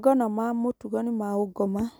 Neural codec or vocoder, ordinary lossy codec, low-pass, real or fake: none; none; none; real